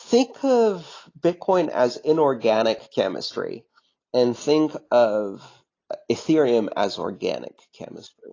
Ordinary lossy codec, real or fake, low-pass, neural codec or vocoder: AAC, 32 kbps; real; 7.2 kHz; none